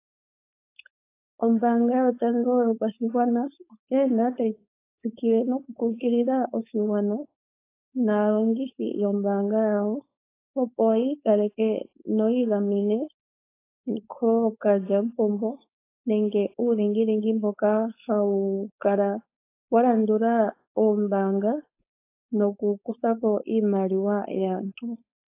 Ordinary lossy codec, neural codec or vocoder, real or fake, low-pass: AAC, 24 kbps; codec, 16 kHz, 4.8 kbps, FACodec; fake; 3.6 kHz